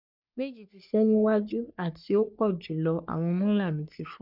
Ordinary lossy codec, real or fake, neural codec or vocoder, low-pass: none; fake; codec, 44.1 kHz, 3.4 kbps, Pupu-Codec; 5.4 kHz